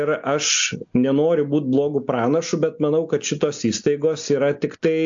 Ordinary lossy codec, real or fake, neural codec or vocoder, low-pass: AAC, 64 kbps; real; none; 7.2 kHz